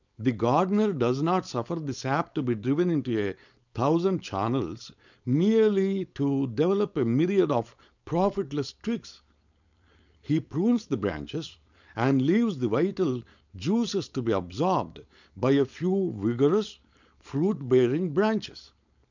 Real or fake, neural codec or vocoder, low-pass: fake; codec, 16 kHz, 4.8 kbps, FACodec; 7.2 kHz